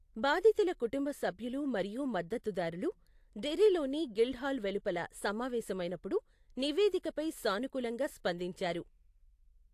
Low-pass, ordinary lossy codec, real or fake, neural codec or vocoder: 14.4 kHz; AAC, 64 kbps; real; none